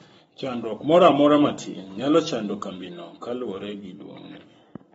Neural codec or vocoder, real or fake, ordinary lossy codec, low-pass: codec, 44.1 kHz, 7.8 kbps, Pupu-Codec; fake; AAC, 24 kbps; 19.8 kHz